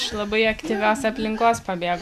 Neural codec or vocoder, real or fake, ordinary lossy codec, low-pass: none; real; Opus, 64 kbps; 14.4 kHz